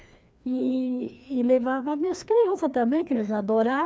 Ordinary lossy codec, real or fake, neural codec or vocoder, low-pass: none; fake; codec, 16 kHz, 2 kbps, FreqCodec, larger model; none